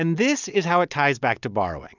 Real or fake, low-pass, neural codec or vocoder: real; 7.2 kHz; none